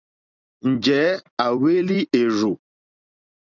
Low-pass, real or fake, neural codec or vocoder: 7.2 kHz; fake; vocoder, 44.1 kHz, 128 mel bands every 256 samples, BigVGAN v2